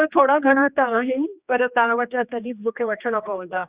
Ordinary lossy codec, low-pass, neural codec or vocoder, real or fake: Opus, 64 kbps; 3.6 kHz; codec, 16 kHz, 2 kbps, X-Codec, HuBERT features, trained on general audio; fake